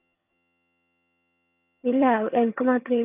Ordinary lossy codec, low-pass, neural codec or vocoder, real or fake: none; 3.6 kHz; vocoder, 22.05 kHz, 80 mel bands, HiFi-GAN; fake